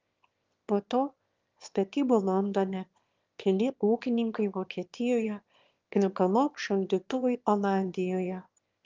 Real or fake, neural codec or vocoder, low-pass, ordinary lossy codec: fake; autoencoder, 22.05 kHz, a latent of 192 numbers a frame, VITS, trained on one speaker; 7.2 kHz; Opus, 32 kbps